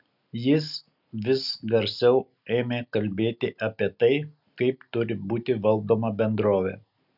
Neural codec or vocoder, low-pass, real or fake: none; 5.4 kHz; real